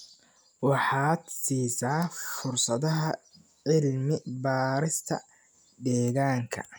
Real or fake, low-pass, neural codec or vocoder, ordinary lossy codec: real; none; none; none